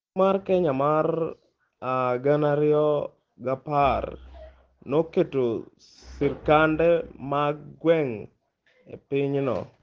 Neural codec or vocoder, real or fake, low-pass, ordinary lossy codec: none; real; 7.2 kHz; Opus, 16 kbps